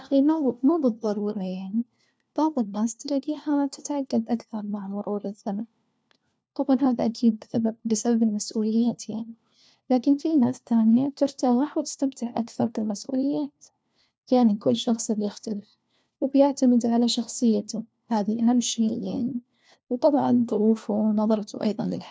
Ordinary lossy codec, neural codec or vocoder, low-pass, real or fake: none; codec, 16 kHz, 1 kbps, FunCodec, trained on LibriTTS, 50 frames a second; none; fake